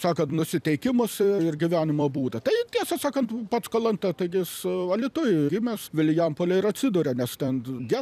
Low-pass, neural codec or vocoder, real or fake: 14.4 kHz; vocoder, 44.1 kHz, 128 mel bands every 256 samples, BigVGAN v2; fake